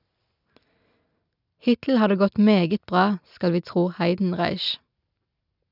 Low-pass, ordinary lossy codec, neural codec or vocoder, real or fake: 5.4 kHz; none; none; real